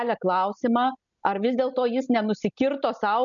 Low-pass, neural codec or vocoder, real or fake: 7.2 kHz; none; real